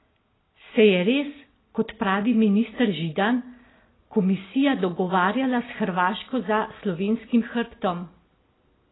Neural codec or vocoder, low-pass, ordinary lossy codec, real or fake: none; 7.2 kHz; AAC, 16 kbps; real